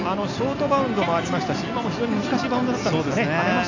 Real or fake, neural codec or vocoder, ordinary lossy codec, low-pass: real; none; AAC, 48 kbps; 7.2 kHz